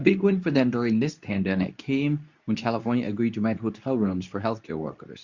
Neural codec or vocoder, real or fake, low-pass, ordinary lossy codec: codec, 24 kHz, 0.9 kbps, WavTokenizer, medium speech release version 2; fake; 7.2 kHz; Opus, 64 kbps